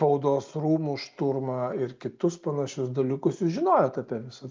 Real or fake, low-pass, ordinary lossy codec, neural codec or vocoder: real; 7.2 kHz; Opus, 24 kbps; none